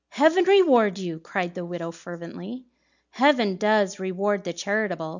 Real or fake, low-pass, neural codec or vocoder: real; 7.2 kHz; none